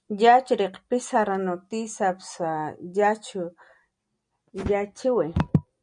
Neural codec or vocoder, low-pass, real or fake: none; 9.9 kHz; real